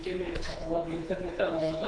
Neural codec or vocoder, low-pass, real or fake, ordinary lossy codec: codec, 24 kHz, 3 kbps, HILCodec; 9.9 kHz; fake; AAC, 64 kbps